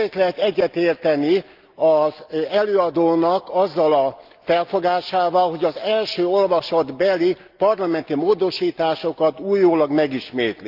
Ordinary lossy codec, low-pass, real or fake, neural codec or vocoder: Opus, 24 kbps; 5.4 kHz; real; none